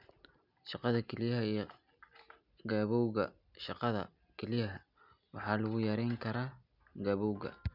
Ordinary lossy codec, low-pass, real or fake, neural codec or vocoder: none; 5.4 kHz; real; none